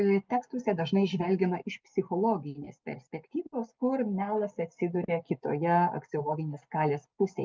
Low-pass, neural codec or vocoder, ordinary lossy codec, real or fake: 7.2 kHz; none; Opus, 24 kbps; real